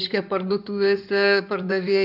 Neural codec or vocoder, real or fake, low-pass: codec, 16 kHz in and 24 kHz out, 2.2 kbps, FireRedTTS-2 codec; fake; 5.4 kHz